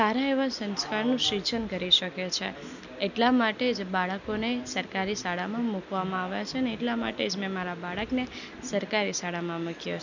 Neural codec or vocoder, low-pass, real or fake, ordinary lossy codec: none; 7.2 kHz; real; none